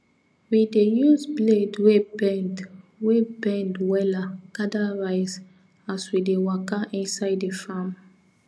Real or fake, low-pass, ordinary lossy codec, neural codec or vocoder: real; none; none; none